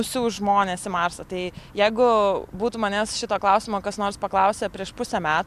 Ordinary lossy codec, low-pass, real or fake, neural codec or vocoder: AAC, 96 kbps; 14.4 kHz; real; none